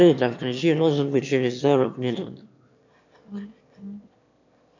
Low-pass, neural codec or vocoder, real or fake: 7.2 kHz; autoencoder, 22.05 kHz, a latent of 192 numbers a frame, VITS, trained on one speaker; fake